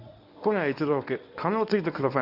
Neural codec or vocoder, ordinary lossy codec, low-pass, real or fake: codec, 24 kHz, 0.9 kbps, WavTokenizer, medium speech release version 1; none; 5.4 kHz; fake